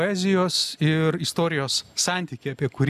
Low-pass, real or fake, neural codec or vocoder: 14.4 kHz; real; none